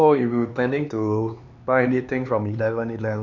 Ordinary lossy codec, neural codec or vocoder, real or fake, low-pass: none; codec, 16 kHz, 4 kbps, X-Codec, HuBERT features, trained on LibriSpeech; fake; 7.2 kHz